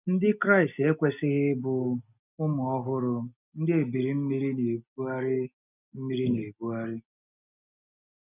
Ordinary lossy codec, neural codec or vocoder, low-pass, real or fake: none; none; 3.6 kHz; real